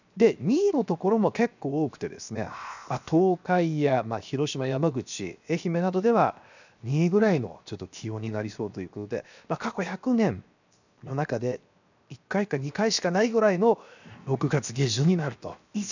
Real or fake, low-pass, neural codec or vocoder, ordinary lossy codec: fake; 7.2 kHz; codec, 16 kHz, 0.7 kbps, FocalCodec; none